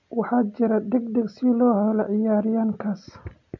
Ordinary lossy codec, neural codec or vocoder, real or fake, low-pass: MP3, 48 kbps; none; real; 7.2 kHz